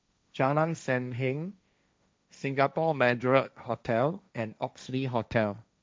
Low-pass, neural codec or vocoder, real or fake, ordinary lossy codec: none; codec, 16 kHz, 1.1 kbps, Voila-Tokenizer; fake; none